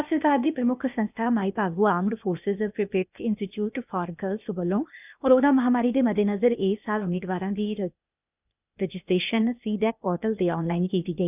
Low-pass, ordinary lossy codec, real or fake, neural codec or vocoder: 3.6 kHz; none; fake; codec, 16 kHz, 0.8 kbps, ZipCodec